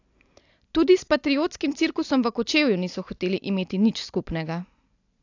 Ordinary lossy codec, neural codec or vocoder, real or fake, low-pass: AAC, 48 kbps; none; real; 7.2 kHz